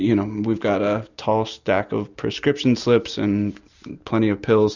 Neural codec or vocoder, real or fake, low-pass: none; real; 7.2 kHz